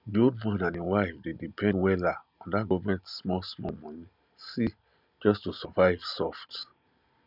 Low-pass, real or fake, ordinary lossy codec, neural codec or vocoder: 5.4 kHz; real; none; none